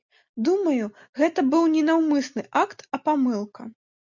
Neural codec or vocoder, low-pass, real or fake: none; 7.2 kHz; real